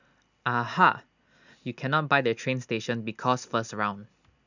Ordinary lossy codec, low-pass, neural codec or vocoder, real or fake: none; 7.2 kHz; none; real